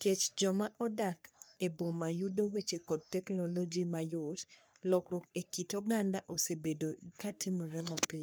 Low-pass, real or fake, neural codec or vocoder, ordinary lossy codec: none; fake; codec, 44.1 kHz, 3.4 kbps, Pupu-Codec; none